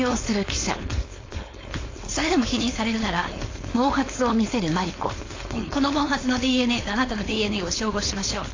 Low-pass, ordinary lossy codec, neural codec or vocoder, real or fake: 7.2 kHz; AAC, 32 kbps; codec, 16 kHz, 4.8 kbps, FACodec; fake